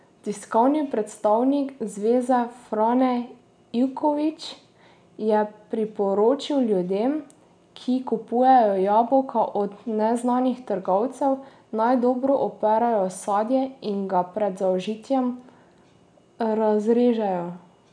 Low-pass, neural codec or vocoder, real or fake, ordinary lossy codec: 9.9 kHz; none; real; none